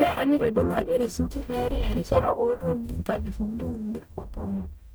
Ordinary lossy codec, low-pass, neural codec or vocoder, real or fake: none; none; codec, 44.1 kHz, 0.9 kbps, DAC; fake